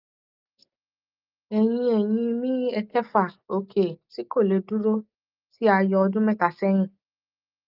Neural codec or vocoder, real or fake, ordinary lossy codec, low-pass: none; real; Opus, 24 kbps; 5.4 kHz